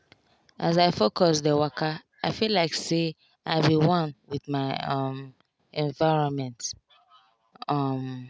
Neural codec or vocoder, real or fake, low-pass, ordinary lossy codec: none; real; none; none